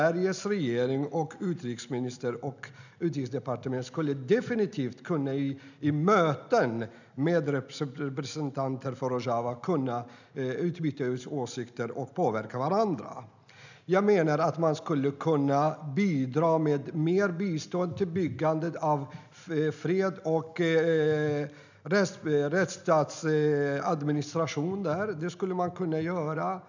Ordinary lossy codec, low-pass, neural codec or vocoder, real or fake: none; 7.2 kHz; none; real